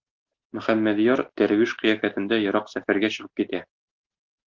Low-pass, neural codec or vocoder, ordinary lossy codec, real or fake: 7.2 kHz; none; Opus, 16 kbps; real